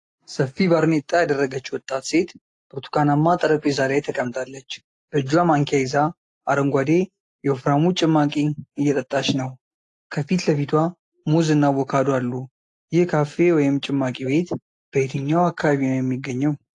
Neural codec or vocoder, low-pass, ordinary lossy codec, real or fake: none; 10.8 kHz; AAC, 48 kbps; real